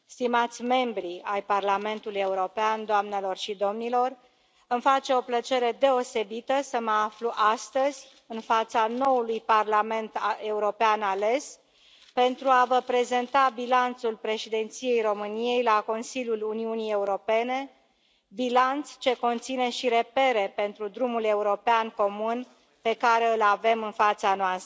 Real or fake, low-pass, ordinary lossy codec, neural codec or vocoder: real; none; none; none